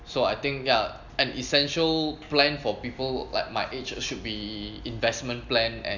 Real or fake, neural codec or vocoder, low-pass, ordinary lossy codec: real; none; 7.2 kHz; none